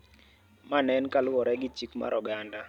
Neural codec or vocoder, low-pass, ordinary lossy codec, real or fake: none; 19.8 kHz; none; real